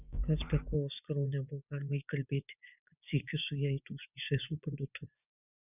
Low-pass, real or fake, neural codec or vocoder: 3.6 kHz; fake; vocoder, 24 kHz, 100 mel bands, Vocos